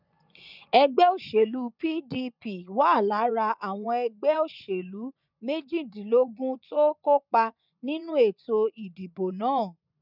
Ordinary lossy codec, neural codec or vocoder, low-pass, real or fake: none; vocoder, 24 kHz, 100 mel bands, Vocos; 5.4 kHz; fake